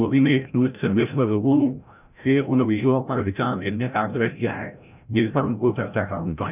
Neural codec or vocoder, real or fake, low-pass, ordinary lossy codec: codec, 16 kHz, 0.5 kbps, FreqCodec, larger model; fake; 3.6 kHz; none